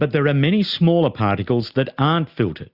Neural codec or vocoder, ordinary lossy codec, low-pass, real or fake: none; Opus, 64 kbps; 5.4 kHz; real